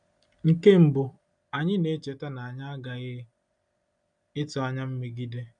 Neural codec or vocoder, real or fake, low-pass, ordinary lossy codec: none; real; 9.9 kHz; none